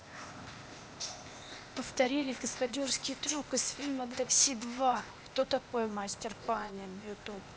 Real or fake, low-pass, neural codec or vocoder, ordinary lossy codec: fake; none; codec, 16 kHz, 0.8 kbps, ZipCodec; none